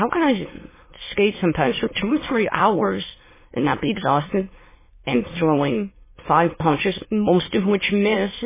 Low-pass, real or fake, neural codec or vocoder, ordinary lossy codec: 3.6 kHz; fake; autoencoder, 22.05 kHz, a latent of 192 numbers a frame, VITS, trained on many speakers; MP3, 16 kbps